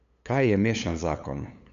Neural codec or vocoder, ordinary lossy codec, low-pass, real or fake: codec, 16 kHz, 8 kbps, FunCodec, trained on LibriTTS, 25 frames a second; none; 7.2 kHz; fake